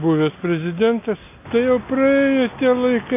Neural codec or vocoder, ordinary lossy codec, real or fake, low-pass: none; MP3, 24 kbps; real; 3.6 kHz